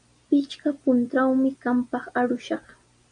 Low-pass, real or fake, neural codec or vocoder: 9.9 kHz; real; none